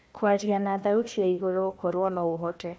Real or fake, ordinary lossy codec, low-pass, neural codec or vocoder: fake; none; none; codec, 16 kHz, 1 kbps, FunCodec, trained on Chinese and English, 50 frames a second